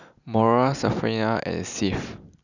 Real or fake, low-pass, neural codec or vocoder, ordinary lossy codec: real; 7.2 kHz; none; none